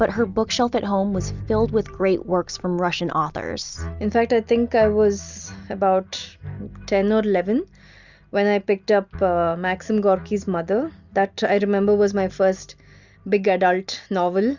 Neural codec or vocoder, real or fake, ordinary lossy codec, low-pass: none; real; Opus, 64 kbps; 7.2 kHz